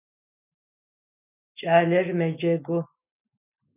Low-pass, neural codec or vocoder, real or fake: 3.6 kHz; none; real